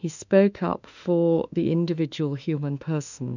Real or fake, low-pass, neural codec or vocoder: fake; 7.2 kHz; autoencoder, 48 kHz, 32 numbers a frame, DAC-VAE, trained on Japanese speech